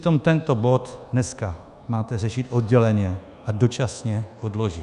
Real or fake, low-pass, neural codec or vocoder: fake; 10.8 kHz; codec, 24 kHz, 1.2 kbps, DualCodec